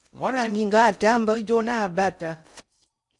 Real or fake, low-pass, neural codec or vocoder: fake; 10.8 kHz; codec, 16 kHz in and 24 kHz out, 0.6 kbps, FocalCodec, streaming, 4096 codes